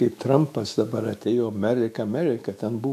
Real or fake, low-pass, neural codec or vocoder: fake; 14.4 kHz; autoencoder, 48 kHz, 128 numbers a frame, DAC-VAE, trained on Japanese speech